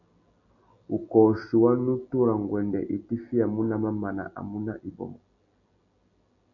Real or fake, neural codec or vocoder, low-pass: real; none; 7.2 kHz